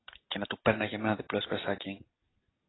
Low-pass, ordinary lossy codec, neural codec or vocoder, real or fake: 7.2 kHz; AAC, 16 kbps; none; real